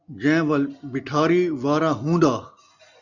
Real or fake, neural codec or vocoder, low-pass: real; none; 7.2 kHz